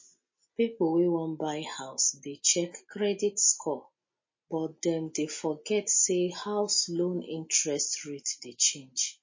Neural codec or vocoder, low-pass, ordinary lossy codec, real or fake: none; 7.2 kHz; MP3, 32 kbps; real